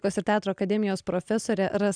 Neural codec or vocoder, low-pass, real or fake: none; 9.9 kHz; real